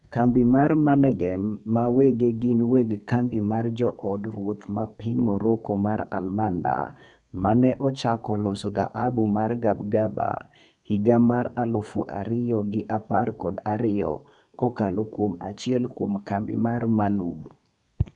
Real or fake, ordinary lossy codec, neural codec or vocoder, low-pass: fake; none; codec, 32 kHz, 1.9 kbps, SNAC; 10.8 kHz